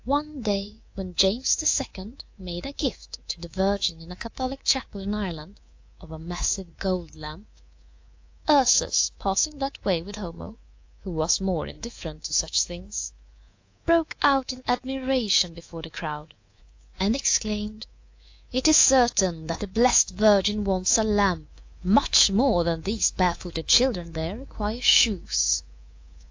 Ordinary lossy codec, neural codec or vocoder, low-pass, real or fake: AAC, 48 kbps; codec, 24 kHz, 3.1 kbps, DualCodec; 7.2 kHz; fake